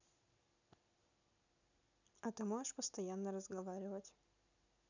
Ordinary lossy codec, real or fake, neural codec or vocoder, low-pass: none; real; none; 7.2 kHz